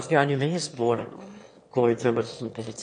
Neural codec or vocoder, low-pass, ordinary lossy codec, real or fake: autoencoder, 22.05 kHz, a latent of 192 numbers a frame, VITS, trained on one speaker; 9.9 kHz; MP3, 48 kbps; fake